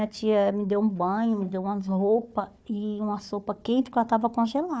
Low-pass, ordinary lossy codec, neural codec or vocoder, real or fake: none; none; codec, 16 kHz, 4 kbps, FunCodec, trained on Chinese and English, 50 frames a second; fake